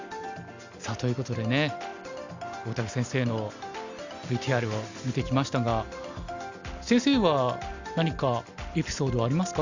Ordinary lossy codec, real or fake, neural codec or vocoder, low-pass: Opus, 64 kbps; real; none; 7.2 kHz